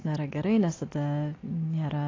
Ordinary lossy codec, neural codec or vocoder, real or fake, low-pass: AAC, 32 kbps; none; real; 7.2 kHz